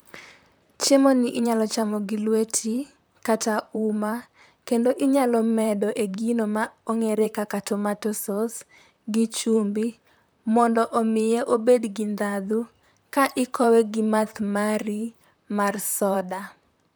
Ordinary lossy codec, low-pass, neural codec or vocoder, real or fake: none; none; vocoder, 44.1 kHz, 128 mel bands, Pupu-Vocoder; fake